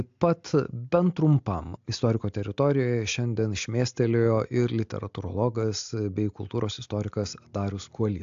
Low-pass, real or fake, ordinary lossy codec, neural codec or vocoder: 7.2 kHz; real; AAC, 64 kbps; none